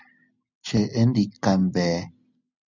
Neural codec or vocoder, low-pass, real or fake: none; 7.2 kHz; real